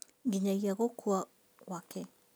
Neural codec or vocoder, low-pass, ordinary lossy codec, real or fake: none; none; none; real